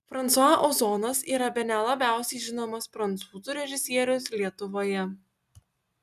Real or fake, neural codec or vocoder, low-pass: real; none; 14.4 kHz